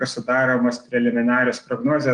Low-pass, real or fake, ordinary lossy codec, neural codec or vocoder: 9.9 kHz; real; Opus, 24 kbps; none